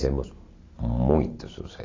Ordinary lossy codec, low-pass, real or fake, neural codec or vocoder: AAC, 32 kbps; 7.2 kHz; real; none